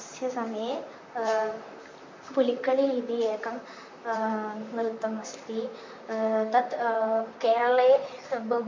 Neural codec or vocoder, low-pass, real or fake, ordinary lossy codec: vocoder, 44.1 kHz, 128 mel bands, Pupu-Vocoder; 7.2 kHz; fake; MP3, 48 kbps